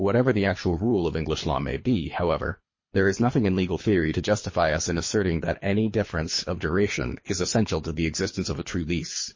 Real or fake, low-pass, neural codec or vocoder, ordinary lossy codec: fake; 7.2 kHz; codec, 24 kHz, 3 kbps, HILCodec; MP3, 32 kbps